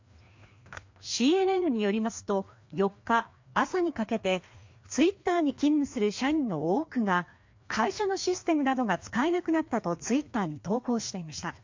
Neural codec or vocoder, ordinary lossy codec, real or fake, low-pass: codec, 16 kHz, 2 kbps, FreqCodec, larger model; MP3, 48 kbps; fake; 7.2 kHz